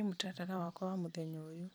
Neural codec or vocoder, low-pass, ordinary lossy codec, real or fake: vocoder, 44.1 kHz, 128 mel bands every 256 samples, BigVGAN v2; none; none; fake